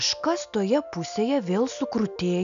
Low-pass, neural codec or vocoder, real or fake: 7.2 kHz; none; real